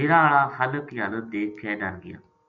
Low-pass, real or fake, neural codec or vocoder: 7.2 kHz; real; none